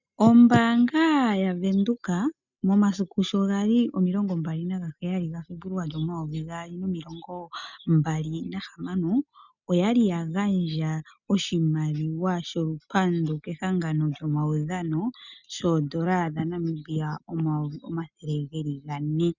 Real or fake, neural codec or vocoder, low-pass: real; none; 7.2 kHz